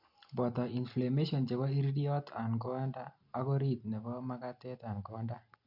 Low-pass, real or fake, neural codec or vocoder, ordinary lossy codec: 5.4 kHz; real; none; none